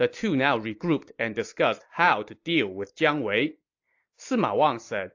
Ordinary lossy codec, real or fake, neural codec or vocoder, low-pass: AAC, 48 kbps; real; none; 7.2 kHz